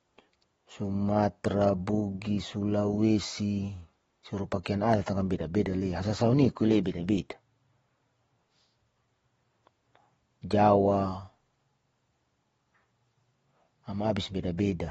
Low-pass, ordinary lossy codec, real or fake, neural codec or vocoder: 19.8 kHz; AAC, 24 kbps; real; none